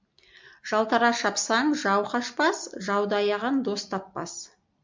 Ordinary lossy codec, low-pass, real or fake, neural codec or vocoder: MP3, 64 kbps; 7.2 kHz; real; none